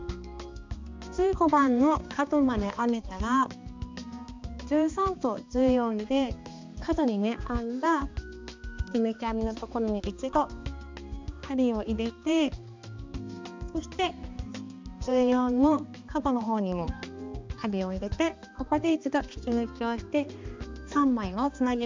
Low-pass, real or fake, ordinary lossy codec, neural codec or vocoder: 7.2 kHz; fake; MP3, 64 kbps; codec, 16 kHz, 2 kbps, X-Codec, HuBERT features, trained on balanced general audio